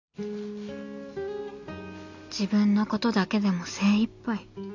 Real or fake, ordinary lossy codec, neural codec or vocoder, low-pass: real; none; none; 7.2 kHz